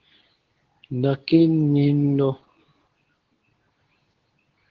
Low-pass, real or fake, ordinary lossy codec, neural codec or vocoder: 7.2 kHz; fake; Opus, 16 kbps; codec, 24 kHz, 0.9 kbps, WavTokenizer, medium speech release version 2